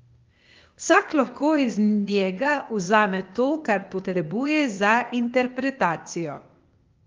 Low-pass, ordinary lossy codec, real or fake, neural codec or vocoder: 7.2 kHz; Opus, 32 kbps; fake; codec, 16 kHz, 0.8 kbps, ZipCodec